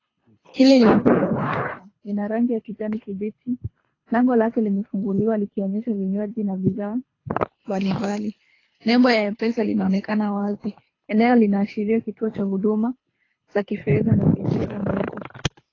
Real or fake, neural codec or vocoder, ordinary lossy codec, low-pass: fake; codec, 24 kHz, 3 kbps, HILCodec; AAC, 32 kbps; 7.2 kHz